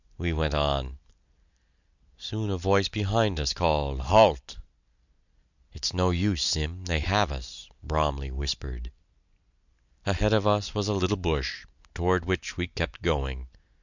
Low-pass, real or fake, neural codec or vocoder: 7.2 kHz; real; none